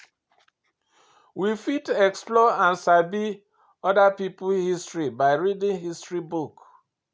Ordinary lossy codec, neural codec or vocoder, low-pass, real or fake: none; none; none; real